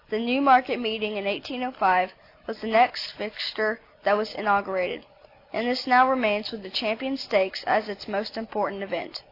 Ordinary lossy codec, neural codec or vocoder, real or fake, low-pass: AAC, 32 kbps; none; real; 5.4 kHz